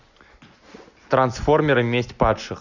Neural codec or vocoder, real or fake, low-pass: none; real; 7.2 kHz